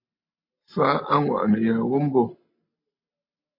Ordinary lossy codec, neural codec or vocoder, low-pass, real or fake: MP3, 32 kbps; none; 5.4 kHz; real